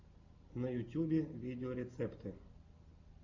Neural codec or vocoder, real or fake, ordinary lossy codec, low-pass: none; real; MP3, 64 kbps; 7.2 kHz